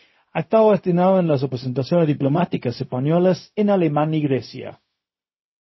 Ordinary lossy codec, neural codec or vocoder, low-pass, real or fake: MP3, 24 kbps; codec, 16 kHz, 0.4 kbps, LongCat-Audio-Codec; 7.2 kHz; fake